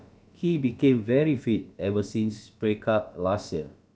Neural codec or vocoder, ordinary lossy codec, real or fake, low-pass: codec, 16 kHz, about 1 kbps, DyCAST, with the encoder's durations; none; fake; none